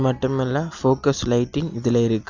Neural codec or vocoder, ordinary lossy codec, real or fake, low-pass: none; none; real; 7.2 kHz